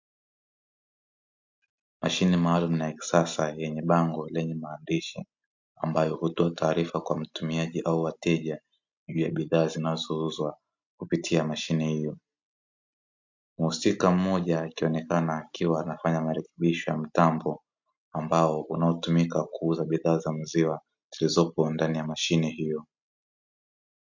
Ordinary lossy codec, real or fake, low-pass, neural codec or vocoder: MP3, 64 kbps; real; 7.2 kHz; none